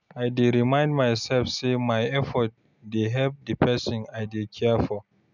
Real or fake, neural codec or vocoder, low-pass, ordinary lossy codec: real; none; 7.2 kHz; none